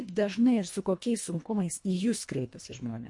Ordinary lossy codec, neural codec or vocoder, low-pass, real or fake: MP3, 48 kbps; codec, 24 kHz, 1.5 kbps, HILCodec; 10.8 kHz; fake